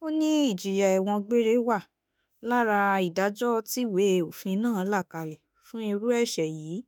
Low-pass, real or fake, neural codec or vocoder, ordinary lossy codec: none; fake; autoencoder, 48 kHz, 32 numbers a frame, DAC-VAE, trained on Japanese speech; none